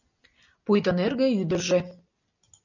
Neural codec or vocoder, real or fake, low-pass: none; real; 7.2 kHz